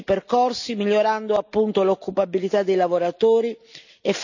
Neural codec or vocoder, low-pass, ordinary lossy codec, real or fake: none; 7.2 kHz; none; real